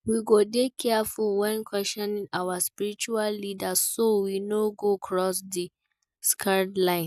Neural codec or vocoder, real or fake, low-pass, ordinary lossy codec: none; real; 14.4 kHz; none